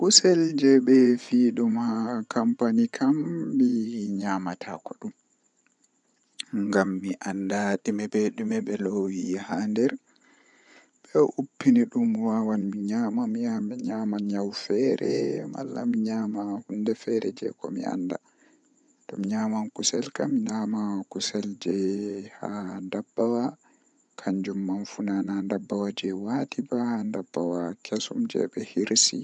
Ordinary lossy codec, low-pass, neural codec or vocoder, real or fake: none; 10.8 kHz; vocoder, 24 kHz, 100 mel bands, Vocos; fake